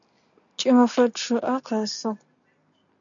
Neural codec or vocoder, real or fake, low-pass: none; real; 7.2 kHz